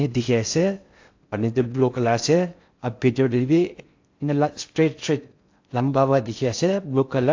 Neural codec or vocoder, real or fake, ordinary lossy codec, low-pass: codec, 16 kHz in and 24 kHz out, 0.6 kbps, FocalCodec, streaming, 2048 codes; fake; AAC, 48 kbps; 7.2 kHz